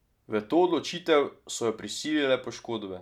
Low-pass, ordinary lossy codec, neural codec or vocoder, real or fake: 19.8 kHz; none; none; real